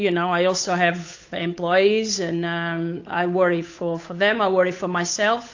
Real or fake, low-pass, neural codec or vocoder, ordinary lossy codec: fake; 7.2 kHz; codec, 16 kHz, 8 kbps, FunCodec, trained on Chinese and English, 25 frames a second; AAC, 48 kbps